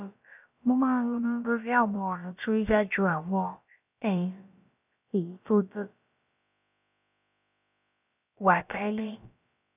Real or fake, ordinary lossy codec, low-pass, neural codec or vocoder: fake; none; 3.6 kHz; codec, 16 kHz, about 1 kbps, DyCAST, with the encoder's durations